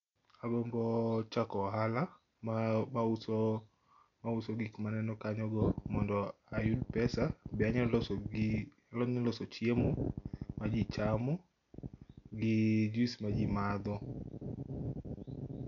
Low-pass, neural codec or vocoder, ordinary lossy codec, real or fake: 7.2 kHz; none; none; real